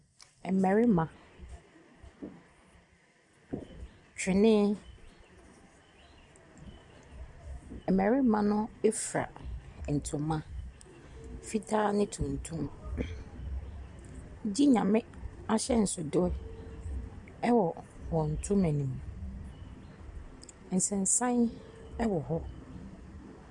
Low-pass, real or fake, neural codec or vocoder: 10.8 kHz; real; none